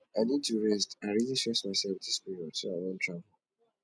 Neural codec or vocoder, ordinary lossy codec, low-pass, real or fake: none; none; none; real